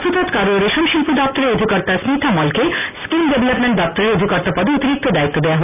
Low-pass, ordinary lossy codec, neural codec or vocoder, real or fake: 3.6 kHz; none; none; real